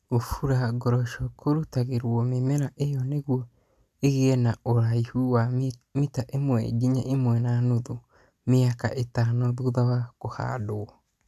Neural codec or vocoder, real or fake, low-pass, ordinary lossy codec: none; real; 14.4 kHz; none